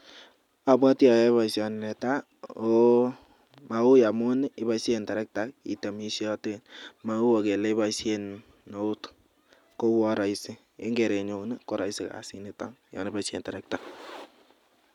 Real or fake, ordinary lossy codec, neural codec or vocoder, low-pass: real; none; none; 19.8 kHz